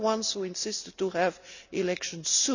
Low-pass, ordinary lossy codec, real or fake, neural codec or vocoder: 7.2 kHz; none; real; none